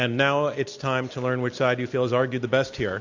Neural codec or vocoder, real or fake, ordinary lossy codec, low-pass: none; real; MP3, 64 kbps; 7.2 kHz